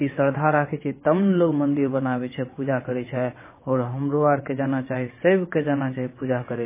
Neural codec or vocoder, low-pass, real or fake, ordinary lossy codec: vocoder, 22.05 kHz, 80 mel bands, WaveNeXt; 3.6 kHz; fake; MP3, 16 kbps